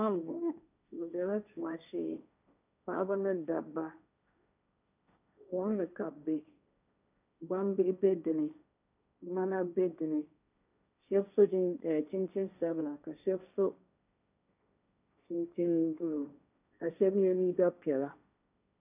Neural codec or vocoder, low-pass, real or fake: codec, 16 kHz, 1.1 kbps, Voila-Tokenizer; 3.6 kHz; fake